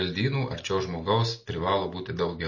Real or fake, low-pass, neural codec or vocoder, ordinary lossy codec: real; 7.2 kHz; none; MP3, 32 kbps